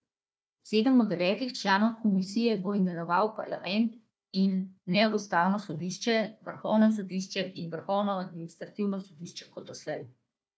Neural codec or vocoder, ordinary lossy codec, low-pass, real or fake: codec, 16 kHz, 1 kbps, FunCodec, trained on Chinese and English, 50 frames a second; none; none; fake